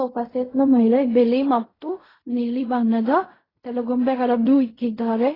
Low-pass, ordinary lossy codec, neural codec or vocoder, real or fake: 5.4 kHz; AAC, 24 kbps; codec, 16 kHz in and 24 kHz out, 0.4 kbps, LongCat-Audio-Codec, fine tuned four codebook decoder; fake